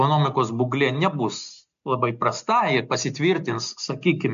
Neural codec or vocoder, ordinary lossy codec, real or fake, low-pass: none; MP3, 64 kbps; real; 7.2 kHz